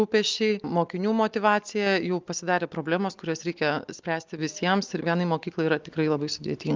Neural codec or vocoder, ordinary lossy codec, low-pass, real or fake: none; Opus, 32 kbps; 7.2 kHz; real